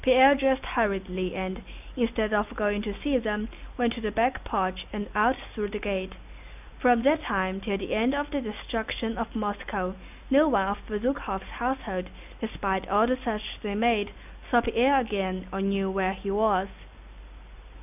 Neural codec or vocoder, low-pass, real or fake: none; 3.6 kHz; real